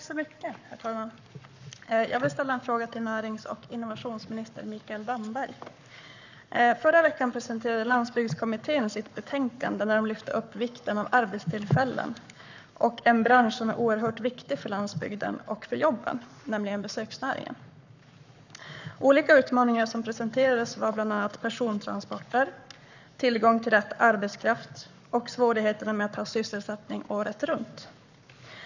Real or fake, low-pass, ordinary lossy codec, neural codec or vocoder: fake; 7.2 kHz; none; codec, 44.1 kHz, 7.8 kbps, Pupu-Codec